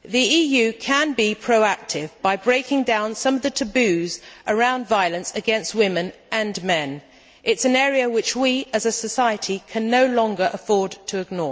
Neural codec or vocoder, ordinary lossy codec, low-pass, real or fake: none; none; none; real